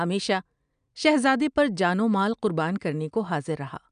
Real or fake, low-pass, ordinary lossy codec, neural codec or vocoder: real; 9.9 kHz; none; none